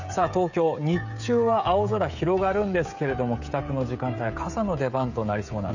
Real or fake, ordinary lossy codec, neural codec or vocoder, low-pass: fake; none; codec, 16 kHz, 16 kbps, FreqCodec, smaller model; 7.2 kHz